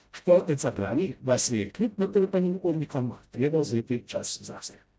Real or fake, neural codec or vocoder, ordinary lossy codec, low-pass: fake; codec, 16 kHz, 0.5 kbps, FreqCodec, smaller model; none; none